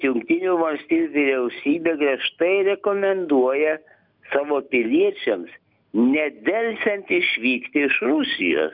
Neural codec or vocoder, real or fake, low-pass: none; real; 5.4 kHz